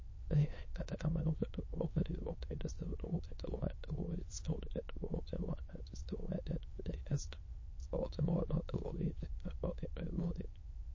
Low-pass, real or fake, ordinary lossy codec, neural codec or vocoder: 7.2 kHz; fake; MP3, 32 kbps; autoencoder, 22.05 kHz, a latent of 192 numbers a frame, VITS, trained on many speakers